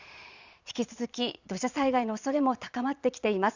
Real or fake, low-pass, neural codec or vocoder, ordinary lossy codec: fake; 7.2 kHz; vocoder, 22.05 kHz, 80 mel bands, Vocos; Opus, 64 kbps